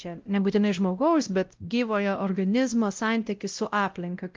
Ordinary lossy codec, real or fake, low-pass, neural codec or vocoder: Opus, 24 kbps; fake; 7.2 kHz; codec, 16 kHz, 0.5 kbps, X-Codec, WavLM features, trained on Multilingual LibriSpeech